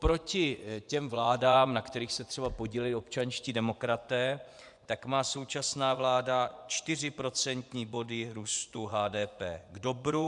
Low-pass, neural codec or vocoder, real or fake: 10.8 kHz; vocoder, 24 kHz, 100 mel bands, Vocos; fake